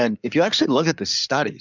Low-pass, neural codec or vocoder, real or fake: 7.2 kHz; codec, 16 kHz in and 24 kHz out, 2.2 kbps, FireRedTTS-2 codec; fake